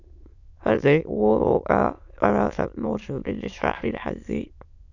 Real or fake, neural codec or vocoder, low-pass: fake; autoencoder, 22.05 kHz, a latent of 192 numbers a frame, VITS, trained on many speakers; 7.2 kHz